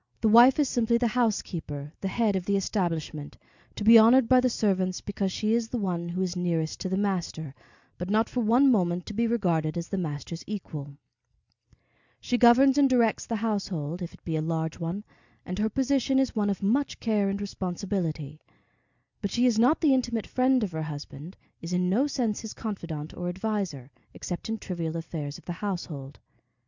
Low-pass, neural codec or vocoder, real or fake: 7.2 kHz; none; real